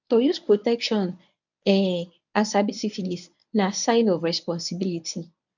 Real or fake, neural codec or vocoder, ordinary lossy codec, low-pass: fake; codec, 24 kHz, 0.9 kbps, WavTokenizer, medium speech release version 1; none; 7.2 kHz